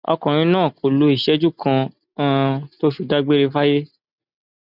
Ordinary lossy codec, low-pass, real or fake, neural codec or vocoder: none; 5.4 kHz; real; none